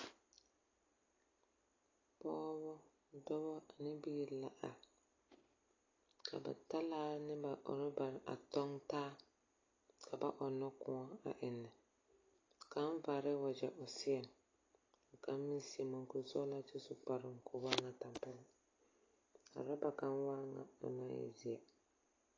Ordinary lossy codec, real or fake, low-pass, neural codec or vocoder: AAC, 32 kbps; real; 7.2 kHz; none